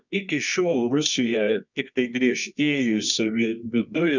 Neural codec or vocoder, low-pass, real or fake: codec, 24 kHz, 0.9 kbps, WavTokenizer, medium music audio release; 7.2 kHz; fake